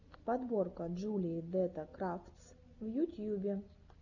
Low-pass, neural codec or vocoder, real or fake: 7.2 kHz; none; real